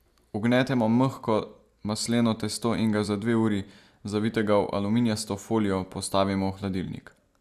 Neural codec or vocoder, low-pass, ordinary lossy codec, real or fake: none; 14.4 kHz; Opus, 64 kbps; real